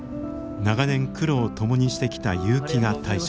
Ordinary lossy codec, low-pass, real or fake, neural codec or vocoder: none; none; real; none